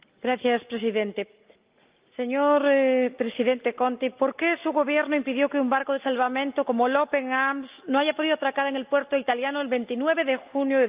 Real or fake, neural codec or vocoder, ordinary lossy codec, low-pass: real; none; Opus, 32 kbps; 3.6 kHz